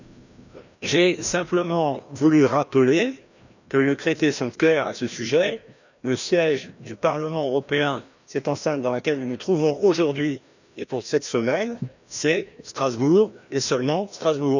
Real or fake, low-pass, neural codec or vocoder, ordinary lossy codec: fake; 7.2 kHz; codec, 16 kHz, 1 kbps, FreqCodec, larger model; none